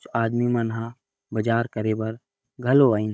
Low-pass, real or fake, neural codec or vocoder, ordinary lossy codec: none; fake; codec, 16 kHz, 16 kbps, FunCodec, trained on Chinese and English, 50 frames a second; none